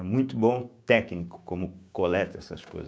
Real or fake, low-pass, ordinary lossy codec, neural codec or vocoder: fake; none; none; codec, 16 kHz, 6 kbps, DAC